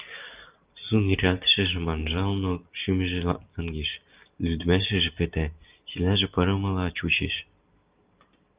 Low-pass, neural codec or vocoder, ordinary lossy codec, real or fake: 3.6 kHz; none; Opus, 32 kbps; real